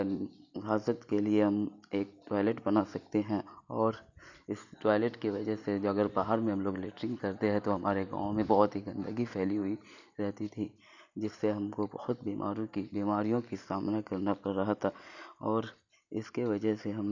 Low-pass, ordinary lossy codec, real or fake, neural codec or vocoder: 7.2 kHz; none; real; none